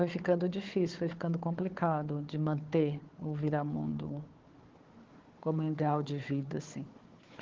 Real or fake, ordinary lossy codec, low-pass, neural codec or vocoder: fake; Opus, 16 kbps; 7.2 kHz; codec, 16 kHz, 4 kbps, FunCodec, trained on Chinese and English, 50 frames a second